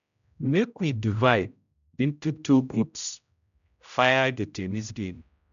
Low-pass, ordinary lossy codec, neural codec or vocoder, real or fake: 7.2 kHz; none; codec, 16 kHz, 0.5 kbps, X-Codec, HuBERT features, trained on general audio; fake